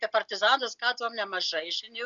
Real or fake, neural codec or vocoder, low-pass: real; none; 7.2 kHz